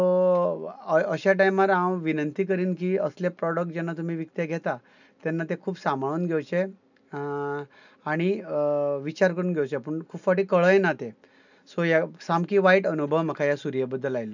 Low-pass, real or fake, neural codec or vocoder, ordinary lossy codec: 7.2 kHz; real; none; none